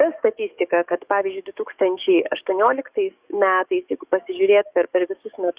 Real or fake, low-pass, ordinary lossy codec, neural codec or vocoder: fake; 3.6 kHz; Opus, 64 kbps; codec, 44.1 kHz, 7.8 kbps, DAC